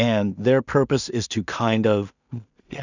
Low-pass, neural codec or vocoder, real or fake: 7.2 kHz; codec, 16 kHz in and 24 kHz out, 0.4 kbps, LongCat-Audio-Codec, two codebook decoder; fake